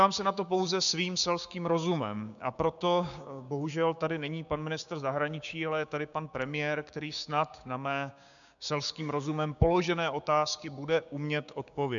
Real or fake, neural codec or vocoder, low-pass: fake; codec, 16 kHz, 6 kbps, DAC; 7.2 kHz